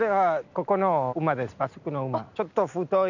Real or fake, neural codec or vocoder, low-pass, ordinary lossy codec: real; none; 7.2 kHz; none